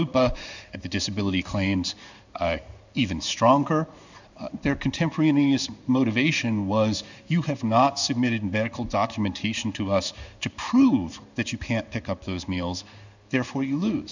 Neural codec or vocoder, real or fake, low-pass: codec, 16 kHz in and 24 kHz out, 1 kbps, XY-Tokenizer; fake; 7.2 kHz